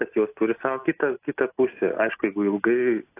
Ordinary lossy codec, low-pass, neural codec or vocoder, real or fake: AAC, 24 kbps; 3.6 kHz; none; real